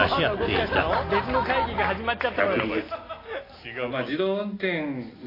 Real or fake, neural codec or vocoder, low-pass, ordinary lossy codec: real; none; 5.4 kHz; AAC, 24 kbps